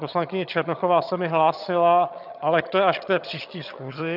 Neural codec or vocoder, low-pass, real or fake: vocoder, 22.05 kHz, 80 mel bands, HiFi-GAN; 5.4 kHz; fake